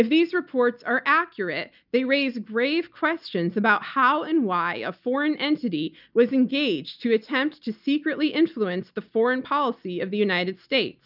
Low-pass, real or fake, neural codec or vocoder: 5.4 kHz; real; none